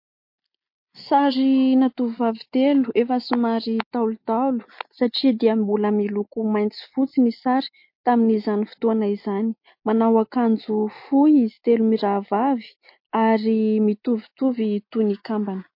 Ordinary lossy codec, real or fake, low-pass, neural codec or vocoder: MP3, 32 kbps; real; 5.4 kHz; none